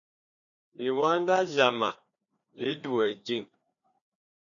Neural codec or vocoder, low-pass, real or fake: codec, 16 kHz, 2 kbps, FreqCodec, larger model; 7.2 kHz; fake